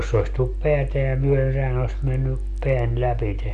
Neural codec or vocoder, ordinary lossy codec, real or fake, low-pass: none; none; real; 9.9 kHz